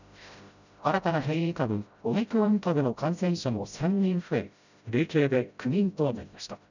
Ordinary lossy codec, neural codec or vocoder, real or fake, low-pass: none; codec, 16 kHz, 0.5 kbps, FreqCodec, smaller model; fake; 7.2 kHz